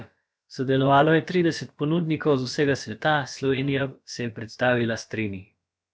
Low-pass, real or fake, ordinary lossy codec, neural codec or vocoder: none; fake; none; codec, 16 kHz, about 1 kbps, DyCAST, with the encoder's durations